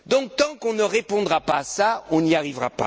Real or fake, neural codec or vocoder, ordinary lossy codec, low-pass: real; none; none; none